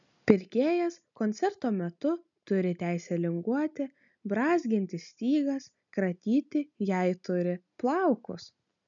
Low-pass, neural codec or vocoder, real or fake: 7.2 kHz; none; real